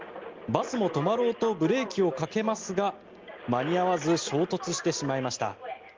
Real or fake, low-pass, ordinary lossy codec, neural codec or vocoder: real; 7.2 kHz; Opus, 32 kbps; none